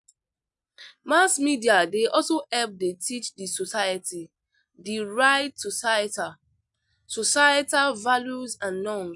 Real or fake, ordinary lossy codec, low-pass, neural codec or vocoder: real; none; 10.8 kHz; none